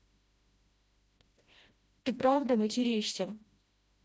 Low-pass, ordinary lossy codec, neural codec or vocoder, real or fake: none; none; codec, 16 kHz, 1 kbps, FreqCodec, smaller model; fake